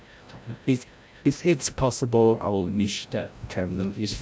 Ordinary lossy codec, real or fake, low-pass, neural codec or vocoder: none; fake; none; codec, 16 kHz, 0.5 kbps, FreqCodec, larger model